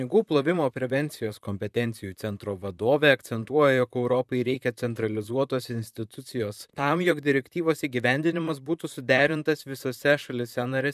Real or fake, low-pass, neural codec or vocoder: fake; 14.4 kHz; vocoder, 44.1 kHz, 128 mel bands, Pupu-Vocoder